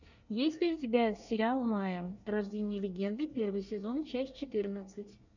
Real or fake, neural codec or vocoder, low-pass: fake; codec, 24 kHz, 1 kbps, SNAC; 7.2 kHz